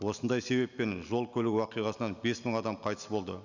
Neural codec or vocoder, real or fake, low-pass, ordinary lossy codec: none; real; 7.2 kHz; none